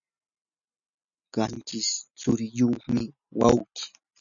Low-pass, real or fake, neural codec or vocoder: 7.2 kHz; real; none